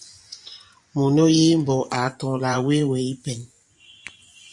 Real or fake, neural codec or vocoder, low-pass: fake; vocoder, 24 kHz, 100 mel bands, Vocos; 10.8 kHz